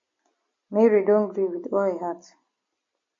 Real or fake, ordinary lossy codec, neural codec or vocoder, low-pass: real; MP3, 32 kbps; none; 7.2 kHz